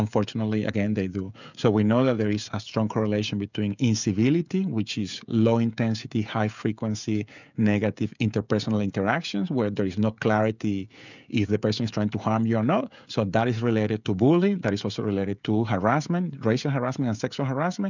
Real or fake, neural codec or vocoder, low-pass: fake; codec, 16 kHz, 16 kbps, FreqCodec, smaller model; 7.2 kHz